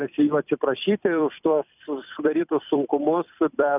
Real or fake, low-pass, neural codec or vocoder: fake; 3.6 kHz; vocoder, 44.1 kHz, 128 mel bands every 256 samples, BigVGAN v2